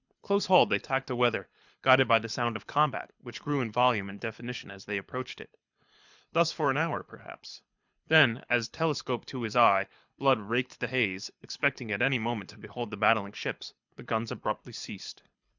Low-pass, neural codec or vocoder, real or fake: 7.2 kHz; codec, 24 kHz, 6 kbps, HILCodec; fake